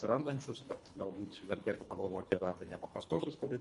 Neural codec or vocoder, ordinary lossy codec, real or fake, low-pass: codec, 24 kHz, 1.5 kbps, HILCodec; MP3, 48 kbps; fake; 10.8 kHz